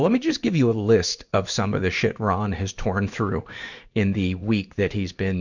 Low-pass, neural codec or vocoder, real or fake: 7.2 kHz; codec, 16 kHz in and 24 kHz out, 1 kbps, XY-Tokenizer; fake